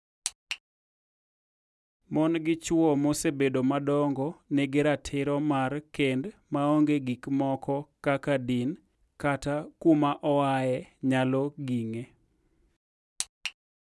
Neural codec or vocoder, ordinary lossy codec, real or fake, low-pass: none; none; real; none